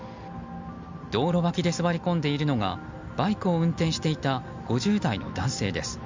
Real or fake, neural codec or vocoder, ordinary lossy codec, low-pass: real; none; MP3, 64 kbps; 7.2 kHz